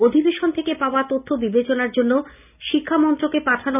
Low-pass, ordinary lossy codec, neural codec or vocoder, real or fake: 3.6 kHz; none; none; real